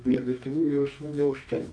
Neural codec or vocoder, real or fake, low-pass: codec, 24 kHz, 0.9 kbps, WavTokenizer, medium music audio release; fake; 9.9 kHz